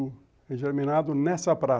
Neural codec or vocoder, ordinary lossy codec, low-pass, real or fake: none; none; none; real